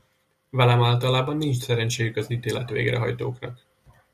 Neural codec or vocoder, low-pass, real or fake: none; 14.4 kHz; real